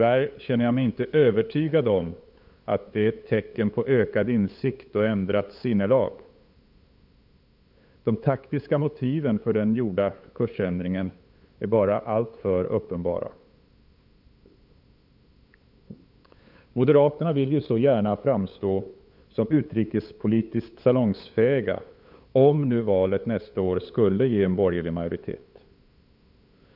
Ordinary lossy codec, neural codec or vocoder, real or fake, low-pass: none; codec, 16 kHz, 8 kbps, FunCodec, trained on LibriTTS, 25 frames a second; fake; 5.4 kHz